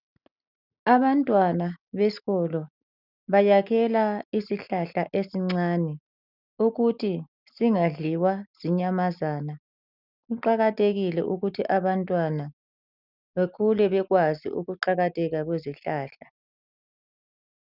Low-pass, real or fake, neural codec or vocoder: 5.4 kHz; real; none